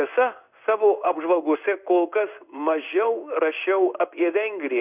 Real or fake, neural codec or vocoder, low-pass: fake; codec, 16 kHz in and 24 kHz out, 1 kbps, XY-Tokenizer; 3.6 kHz